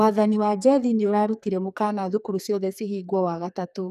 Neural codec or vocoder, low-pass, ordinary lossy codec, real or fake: codec, 44.1 kHz, 2.6 kbps, SNAC; 14.4 kHz; none; fake